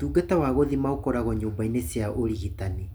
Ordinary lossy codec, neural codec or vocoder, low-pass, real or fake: none; none; none; real